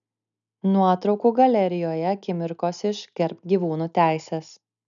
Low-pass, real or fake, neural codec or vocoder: 7.2 kHz; real; none